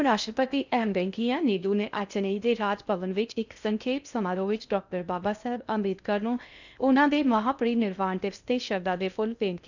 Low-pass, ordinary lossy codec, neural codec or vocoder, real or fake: 7.2 kHz; none; codec, 16 kHz in and 24 kHz out, 0.6 kbps, FocalCodec, streaming, 4096 codes; fake